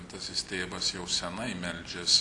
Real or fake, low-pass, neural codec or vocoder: real; 10.8 kHz; none